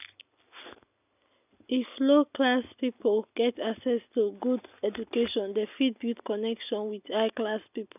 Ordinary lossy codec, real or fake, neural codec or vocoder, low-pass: none; real; none; 3.6 kHz